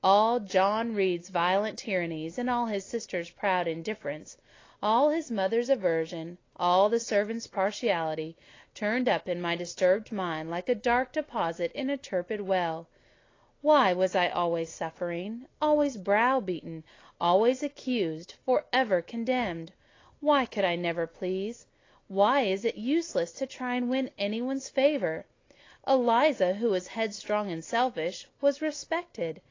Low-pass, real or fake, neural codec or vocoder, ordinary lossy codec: 7.2 kHz; real; none; AAC, 32 kbps